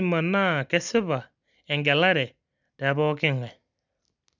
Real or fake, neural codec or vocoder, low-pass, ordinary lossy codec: real; none; 7.2 kHz; none